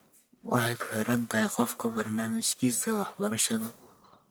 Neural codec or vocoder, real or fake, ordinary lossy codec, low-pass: codec, 44.1 kHz, 1.7 kbps, Pupu-Codec; fake; none; none